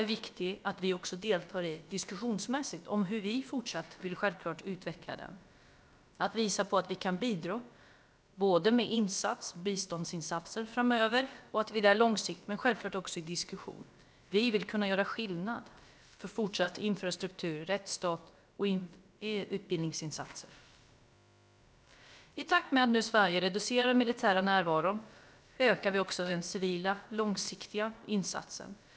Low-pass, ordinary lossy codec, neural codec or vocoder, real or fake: none; none; codec, 16 kHz, about 1 kbps, DyCAST, with the encoder's durations; fake